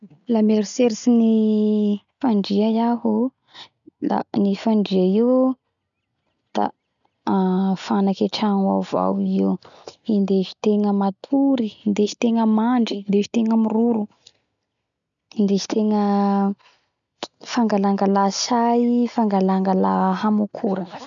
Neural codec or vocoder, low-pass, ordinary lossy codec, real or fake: none; 7.2 kHz; none; real